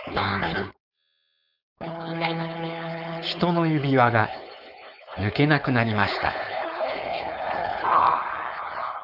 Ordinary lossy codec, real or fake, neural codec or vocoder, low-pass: none; fake; codec, 16 kHz, 4.8 kbps, FACodec; 5.4 kHz